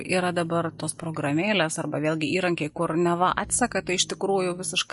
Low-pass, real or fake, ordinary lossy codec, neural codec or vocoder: 14.4 kHz; real; MP3, 48 kbps; none